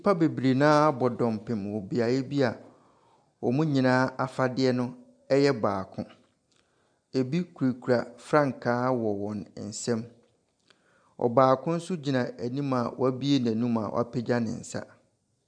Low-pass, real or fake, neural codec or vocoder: 9.9 kHz; real; none